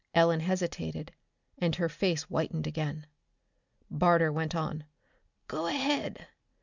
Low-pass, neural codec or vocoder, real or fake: 7.2 kHz; none; real